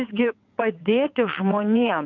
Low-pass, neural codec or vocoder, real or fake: 7.2 kHz; vocoder, 22.05 kHz, 80 mel bands, WaveNeXt; fake